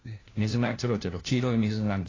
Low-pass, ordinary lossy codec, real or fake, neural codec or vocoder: 7.2 kHz; AAC, 32 kbps; fake; codec, 16 kHz, 1 kbps, FunCodec, trained on LibriTTS, 50 frames a second